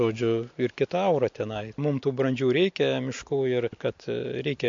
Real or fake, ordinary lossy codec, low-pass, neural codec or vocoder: real; MP3, 48 kbps; 7.2 kHz; none